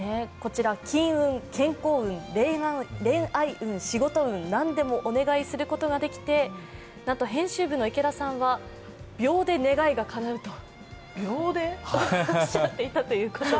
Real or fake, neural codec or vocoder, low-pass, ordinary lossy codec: real; none; none; none